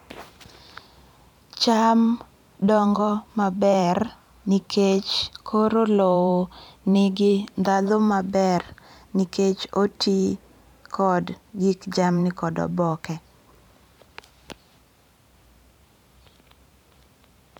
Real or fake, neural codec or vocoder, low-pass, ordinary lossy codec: fake; vocoder, 44.1 kHz, 128 mel bands every 512 samples, BigVGAN v2; 19.8 kHz; none